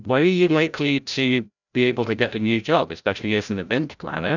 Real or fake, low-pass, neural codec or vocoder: fake; 7.2 kHz; codec, 16 kHz, 0.5 kbps, FreqCodec, larger model